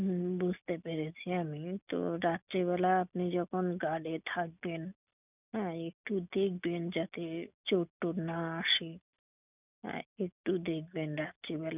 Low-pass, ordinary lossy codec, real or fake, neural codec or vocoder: 3.6 kHz; none; real; none